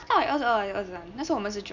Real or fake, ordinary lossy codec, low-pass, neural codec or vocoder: real; none; 7.2 kHz; none